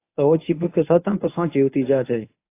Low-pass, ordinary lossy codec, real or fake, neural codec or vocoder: 3.6 kHz; AAC, 24 kbps; fake; codec, 24 kHz, 0.9 kbps, WavTokenizer, medium speech release version 1